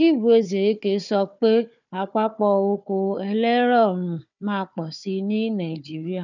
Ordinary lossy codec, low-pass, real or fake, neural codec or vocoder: none; 7.2 kHz; fake; codec, 16 kHz, 4 kbps, FunCodec, trained on Chinese and English, 50 frames a second